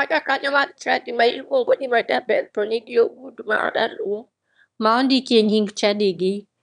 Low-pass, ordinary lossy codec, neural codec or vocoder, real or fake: 9.9 kHz; none; autoencoder, 22.05 kHz, a latent of 192 numbers a frame, VITS, trained on one speaker; fake